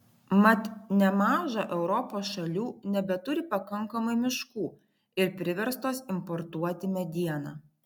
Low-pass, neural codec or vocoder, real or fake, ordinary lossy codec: 19.8 kHz; none; real; MP3, 96 kbps